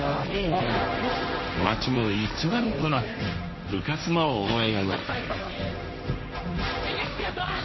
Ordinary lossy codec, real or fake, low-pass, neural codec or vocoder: MP3, 24 kbps; fake; 7.2 kHz; codec, 16 kHz, 1.1 kbps, Voila-Tokenizer